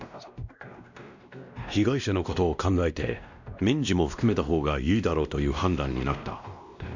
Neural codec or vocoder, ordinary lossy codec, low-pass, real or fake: codec, 16 kHz, 1 kbps, X-Codec, WavLM features, trained on Multilingual LibriSpeech; none; 7.2 kHz; fake